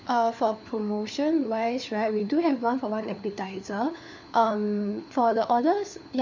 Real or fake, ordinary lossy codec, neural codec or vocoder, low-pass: fake; none; codec, 16 kHz, 4 kbps, FunCodec, trained on LibriTTS, 50 frames a second; 7.2 kHz